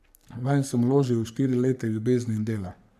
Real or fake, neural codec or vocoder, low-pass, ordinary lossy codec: fake; codec, 44.1 kHz, 3.4 kbps, Pupu-Codec; 14.4 kHz; none